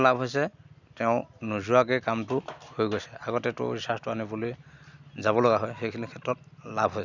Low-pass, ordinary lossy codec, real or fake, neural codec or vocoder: 7.2 kHz; none; real; none